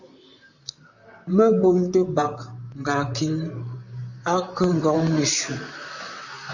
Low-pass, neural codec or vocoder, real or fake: 7.2 kHz; vocoder, 44.1 kHz, 128 mel bands, Pupu-Vocoder; fake